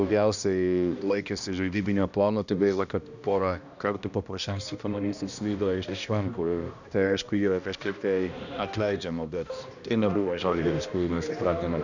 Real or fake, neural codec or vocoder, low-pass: fake; codec, 16 kHz, 1 kbps, X-Codec, HuBERT features, trained on balanced general audio; 7.2 kHz